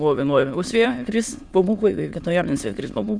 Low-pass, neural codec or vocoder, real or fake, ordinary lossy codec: 9.9 kHz; autoencoder, 22.05 kHz, a latent of 192 numbers a frame, VITS, trained on many speakers; fake; Opus, 64 kbps